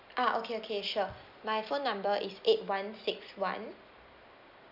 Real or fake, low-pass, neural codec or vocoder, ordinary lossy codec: real; 5.4 kHz; none; none